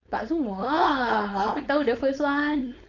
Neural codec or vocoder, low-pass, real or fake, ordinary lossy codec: codec, 16 kHz, 4.8 kbps, FACodec; 7.2 kHz; fake; none